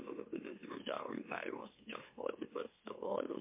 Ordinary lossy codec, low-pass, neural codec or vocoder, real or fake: MP3, 24 kbps; 3.6 kHz; autoencoder, 44.1 kHz, a latent of 192 numbers a frame, MeloTTS; fake